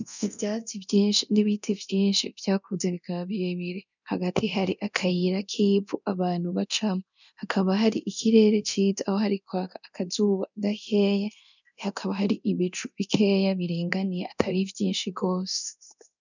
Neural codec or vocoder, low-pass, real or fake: codec, 24 kHz, 0.9 kbps, DualCodec; 7.2 kHz; fake